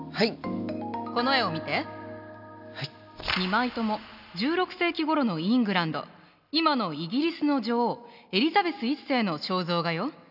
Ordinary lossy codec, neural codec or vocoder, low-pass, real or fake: none; none; 5.4 kHz; real